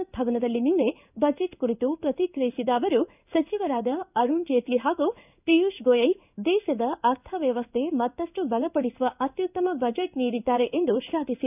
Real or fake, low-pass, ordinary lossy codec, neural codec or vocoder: fake; 3.6 kHz; none; codec, 16 kHz in and 24 kHz out, 1 kbps, XY-Tokenizer